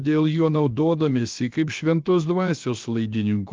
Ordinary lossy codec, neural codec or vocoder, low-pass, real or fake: Opus, 32 kbps; codec, 16 kHz, about 1 kbps, DyCAST, with the encoder's durations; 7.2 kHz; fake